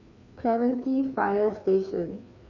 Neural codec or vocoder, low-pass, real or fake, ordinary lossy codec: codec, 16 kHz, 2 kbps, FreqCodec, larger model; 7.2 kHz; fake; none